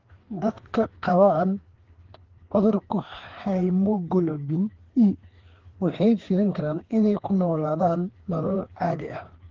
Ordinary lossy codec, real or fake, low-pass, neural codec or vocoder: Opus, 16 kbps; fake; 7.2 kHz; codec, 16 kHz, 2 kbps, FreqCodec, larger model